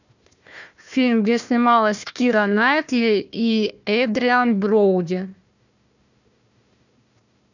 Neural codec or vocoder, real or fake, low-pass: codec, 16 kHz, 1 kbps, FunCodec, trained on Chinese and English, 50 frames a second; fake; 7.2 kHz